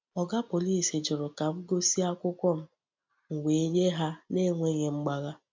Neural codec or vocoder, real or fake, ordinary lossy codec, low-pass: none; real; MP3, 64 kbps; 7.2 kHz